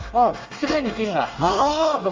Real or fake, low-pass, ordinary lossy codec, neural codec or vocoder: fake; 7.2 kHz; Opus, 32 kbps; codec, 24 kHz, 1 kbps, SNAC